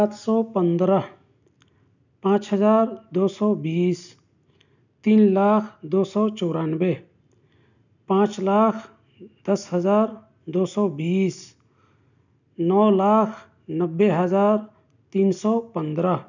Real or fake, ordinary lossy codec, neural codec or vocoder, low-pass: real; none; none; 7.2 kHz